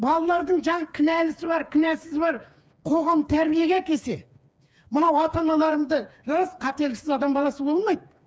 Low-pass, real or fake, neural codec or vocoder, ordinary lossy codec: none; fake; codec, 16 kHz, 4 kbps, FreqCodec, smaller model; none